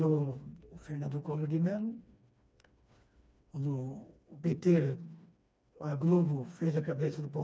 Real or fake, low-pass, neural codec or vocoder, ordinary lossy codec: fake; none; codec, 16 kHz, 2 kbps, FreqCodec, smaller model; none